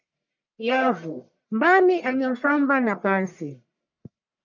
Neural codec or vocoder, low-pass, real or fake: codec, 44.1 kHz, 1.7 kbps, Pupu-Codec; 7.2 kHz; fake